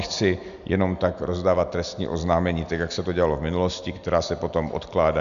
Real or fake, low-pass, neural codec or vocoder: real; 7.2 kHz; none